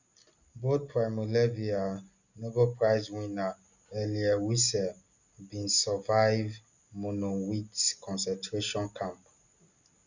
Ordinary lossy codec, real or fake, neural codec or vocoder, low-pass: none; real; none; 7.2 kHz